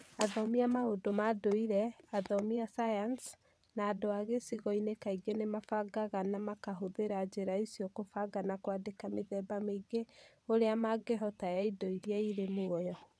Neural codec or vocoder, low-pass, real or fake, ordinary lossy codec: vocoder, 22.05 kHz, 80 mel bands, WaveNeXt; none; fake; none